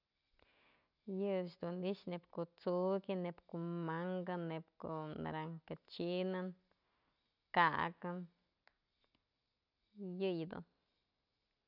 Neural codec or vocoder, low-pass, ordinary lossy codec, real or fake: none; 5.4 kHz; none; real